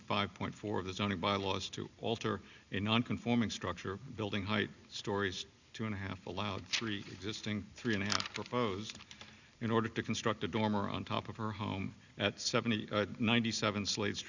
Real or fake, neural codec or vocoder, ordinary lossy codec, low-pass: real; none; Opus, 64 kbps; 7.2 kHz